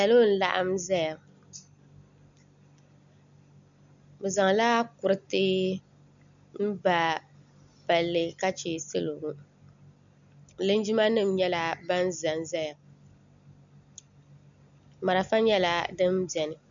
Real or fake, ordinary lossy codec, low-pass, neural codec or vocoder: real; MP3, 96 kbps; 7.2 kHz; none